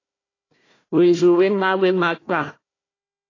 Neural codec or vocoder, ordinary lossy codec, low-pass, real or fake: codec, 16 kHz, 1 kbps, FunCodec, trained on Chinese and English, 50 frames a second; AAC, 32 kbps; 7.2 kHz; fake